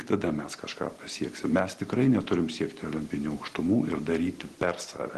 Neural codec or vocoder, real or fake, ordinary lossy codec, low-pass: none; real; Opus, 16 kbps; 10.8 kHz